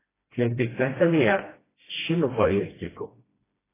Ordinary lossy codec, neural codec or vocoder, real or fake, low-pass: AAC, 16 kbps; codec, 16 kHz, 1 kbps, FreqCodec, smaller model; fake; 3.6 kHz